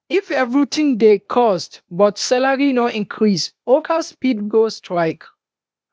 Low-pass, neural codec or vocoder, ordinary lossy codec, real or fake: none; codec, 16 kHz, 0.8 kbps, ZipCodec; none; fake